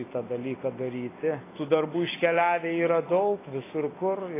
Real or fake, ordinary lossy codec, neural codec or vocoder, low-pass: fake; AAC, 16 kbps; vocoder, 44.1 kHz, 128 mel bands every 256 samples, BigVGAN v2; 3.6 kHz